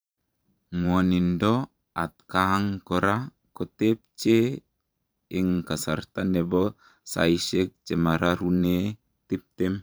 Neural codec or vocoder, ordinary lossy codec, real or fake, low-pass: vocoder, 44.1 kHz, 128 mel bands every 512 samples, BigVGAN v2; none; fake; none